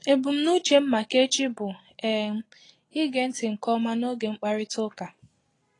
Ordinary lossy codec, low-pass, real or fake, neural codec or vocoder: AAC, 32 kbps; 10.8 kHz; real; none